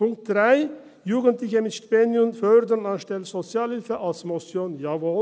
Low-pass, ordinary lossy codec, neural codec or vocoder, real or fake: none; none; none; real